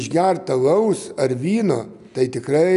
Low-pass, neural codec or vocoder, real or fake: 10.8 kHz; none; real